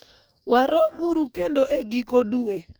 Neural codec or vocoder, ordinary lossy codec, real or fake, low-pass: codec, 44.1 kHz, 2.6 kbps, DAC; none; fake; none